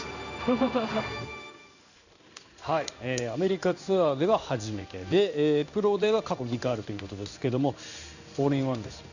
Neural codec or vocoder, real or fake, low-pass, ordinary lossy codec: codec, 16 kHz in and 24 kHz out, 1 kbps, XY-Tokenizer; fake; 7.2 kHz; none